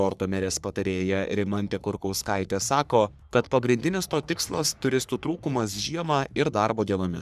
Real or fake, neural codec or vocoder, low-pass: fake; codec, 44.1 kHz, 3.4 kbps, Pupu-Codec; 14.4 kHz